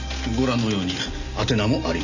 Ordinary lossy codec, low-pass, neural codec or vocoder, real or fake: none; 7.2 kHz; none; real